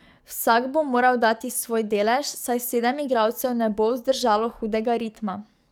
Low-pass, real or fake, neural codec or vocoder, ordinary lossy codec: none; fake; codec, 44.1 kHz, 7.8 kbps, DAC; none